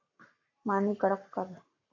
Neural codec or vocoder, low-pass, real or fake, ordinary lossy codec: codec, 44.1 kHz, 7.8 kbps, Pupu-Codec; 7.2 kHz; fake; MP3, 64 kbps